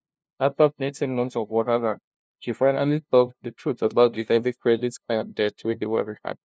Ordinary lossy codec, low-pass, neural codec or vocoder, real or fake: none; none; codec, 16 kHz, 0.5 kbps, FunCodec, trained on LibriTTS, 25 frames a second; fake